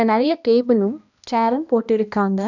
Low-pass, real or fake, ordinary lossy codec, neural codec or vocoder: 7.2 kHz; fake; none; codec, 16 kHz, 1 kbps, X-Codec, HuBERT features, trained on balanced general audio